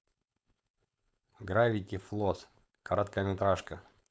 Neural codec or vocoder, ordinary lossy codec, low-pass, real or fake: codec, 16 kHz, 4.8 kbps, FACodec; none; none; fake